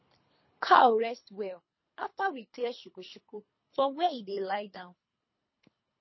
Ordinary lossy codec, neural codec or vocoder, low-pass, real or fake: MP3, 24 kbps; codec, 24 kHz, 3 kbps, HILCodec; 7.2 kHz; fake